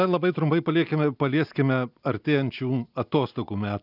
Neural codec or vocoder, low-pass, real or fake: none; 5.4 kHz; real